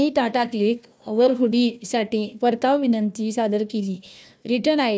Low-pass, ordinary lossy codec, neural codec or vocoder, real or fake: none; none; codec, 16 kHz, 1 kbps, FunCodec, trained on Chinese and English, 50 frames a second; fake